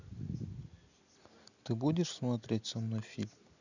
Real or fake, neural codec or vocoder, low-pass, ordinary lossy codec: real; none; 7.2 kHz; none